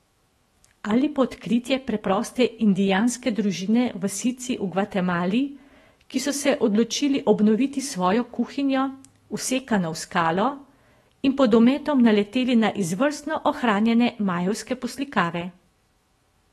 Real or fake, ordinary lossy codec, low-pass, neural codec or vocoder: fake; AAC, 32 kbps; 19.8 kHz; autoencoder, 48 kHz, 128 numbers a frame, DAC-VAE, trained on Japanese speech